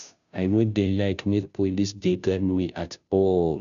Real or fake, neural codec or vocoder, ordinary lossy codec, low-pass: fake; codec, 16 kHz, 0.5 kbps, FunCodec, trained on Chinese and English, 25 frames a second; none; 7.2 kHz